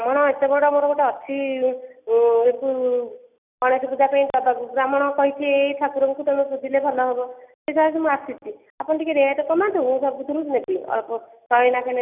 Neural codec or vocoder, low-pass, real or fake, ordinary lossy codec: none; 3.6 kHz; real; none